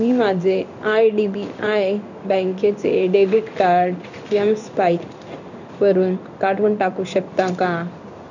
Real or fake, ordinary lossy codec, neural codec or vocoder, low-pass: fake; none; codec, 16 kHz in and 24 kHz out, 1 kbps, XY-Tokenizer; 7.2 kHz